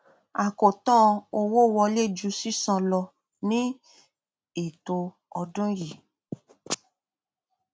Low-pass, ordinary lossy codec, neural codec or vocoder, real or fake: none; none; none; real